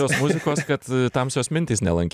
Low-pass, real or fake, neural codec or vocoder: 14.4 kHz; real; none